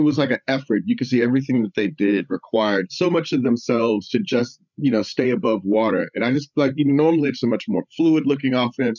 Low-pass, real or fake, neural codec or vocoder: 7.2 kHz; fake; codec, 16 kHz, 8 kbps, FreqCodec, larger model